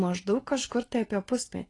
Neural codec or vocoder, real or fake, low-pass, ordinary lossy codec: none; real; 10.8 kHz; AAC, 32 kbps